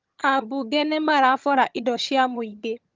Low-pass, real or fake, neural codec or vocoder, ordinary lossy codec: 7.2 kHz; fake; vocoder, 22.05 kHz, 80 mel bands, HiFi-GAN; Opus, 32 kbps